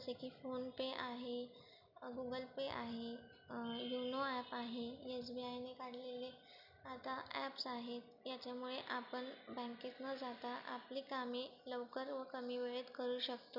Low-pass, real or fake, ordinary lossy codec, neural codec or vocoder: 5.4 kHz; real; none; none